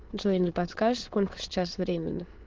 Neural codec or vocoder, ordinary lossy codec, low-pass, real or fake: autoencoder, 22.05 kHz, a latent of 192 numbers a frame, VITS, trained on many speakers; Opus, 16 kbps; 7.2 kHz; fake